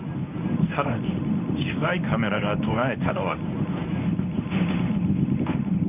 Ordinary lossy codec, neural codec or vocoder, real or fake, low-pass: none; codec, 24 kHz, 0.9 kbps, WavTokenizer, medium speech release version 1; fake; 3.6 kHz